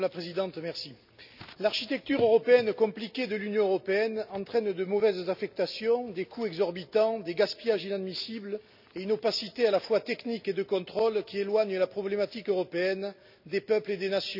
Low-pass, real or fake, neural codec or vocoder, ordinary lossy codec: 5.4 kHz; real; none; none